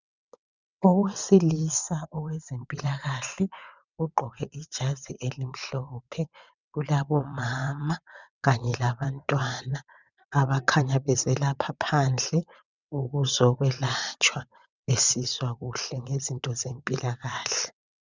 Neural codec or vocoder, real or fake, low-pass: vocoder, 22.05 kHz, 80 mel bands, WaveNeXt; fake; 7.2 kHz